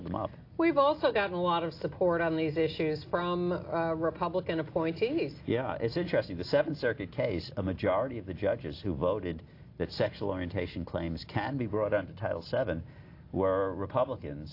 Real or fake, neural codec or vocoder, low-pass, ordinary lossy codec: real; none; 5.4 kHz; AAC, 32 kbps